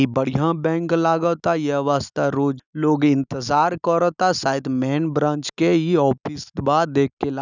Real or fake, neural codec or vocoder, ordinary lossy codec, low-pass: real; none; none; 7.2 kHz